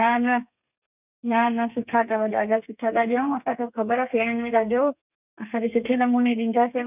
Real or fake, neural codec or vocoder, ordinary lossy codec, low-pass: fake; codec, 32 kHz, 1.9 kbps, SNAC; none; 3.6 kHz